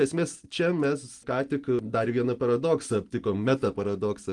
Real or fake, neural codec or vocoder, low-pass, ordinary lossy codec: real; none; 10.8 kHz; Opus, 24 kbps